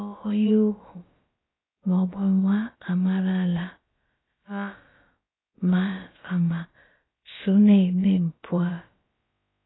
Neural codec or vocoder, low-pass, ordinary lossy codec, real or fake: codec, 16 kHz, about 1 kbps, DyCAST, with the encoder's durations; 7.2 kHz; AAC, 16 kbps; fake